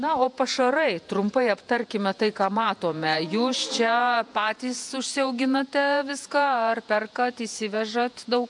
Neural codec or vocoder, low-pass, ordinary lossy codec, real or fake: vocoder, 48 kHz, 128 mel bands, Vocos; 10.8 kHz; MP3, 64 kbps; fake